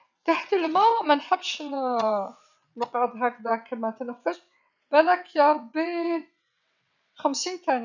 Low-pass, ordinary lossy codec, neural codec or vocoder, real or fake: 7.2 kHz; none; vocoder, 22.05 kHz, 80 mel bands, WaveNeXt; fake